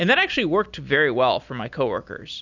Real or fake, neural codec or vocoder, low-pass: real; none; 7.2 kHz